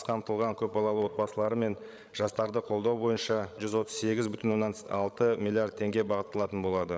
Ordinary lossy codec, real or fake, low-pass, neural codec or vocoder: none; fake; none; codec, 16 kHz, 16 kbps, FreqCodec, larger model